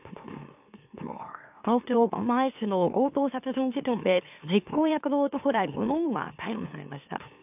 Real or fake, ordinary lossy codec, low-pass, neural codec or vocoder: fake; AAC, 32 kbps; 3.6 kHz; autoencoder, 44.1 kHz, a latent of 192 numbers a frame, MeloTTS